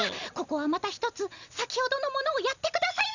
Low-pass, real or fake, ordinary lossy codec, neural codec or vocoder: 7.2 kHz; real; none; none